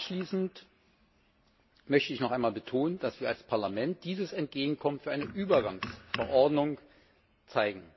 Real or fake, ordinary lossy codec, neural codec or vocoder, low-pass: real; MP3, 24 kbps; none; 7.2 kHz